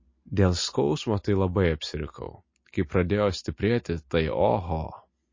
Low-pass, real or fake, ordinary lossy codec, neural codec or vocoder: 7.2 kHz; real; MP3, 32 kbps; none